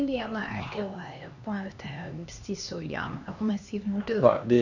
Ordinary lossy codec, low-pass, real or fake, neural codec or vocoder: none; 7.2 kHz; fake; codec, 16 kHz, 2 kbps, X-Codec, HuBERT features, trained on LibriSpeech